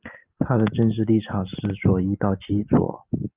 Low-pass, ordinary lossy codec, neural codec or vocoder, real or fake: 3.6 kHz; Opus, 24 kbps; none; real